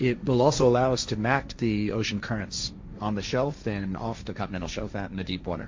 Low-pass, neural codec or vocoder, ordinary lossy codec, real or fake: 7.2 kHz; codec, 16 kHz, 1.1 kbps, Voila-Tokenizer; MP3, 48 kbps; fake